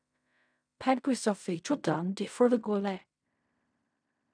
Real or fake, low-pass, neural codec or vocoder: fake; 9.9 kHz; codec, 16 kHz in and 24 kHz out, 0.4 kbps, LongCat-Audio-Codec, fine tuned four codebook decoder